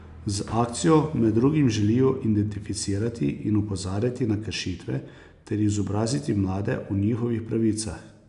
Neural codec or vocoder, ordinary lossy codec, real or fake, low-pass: none; none; real; 10.8 kHz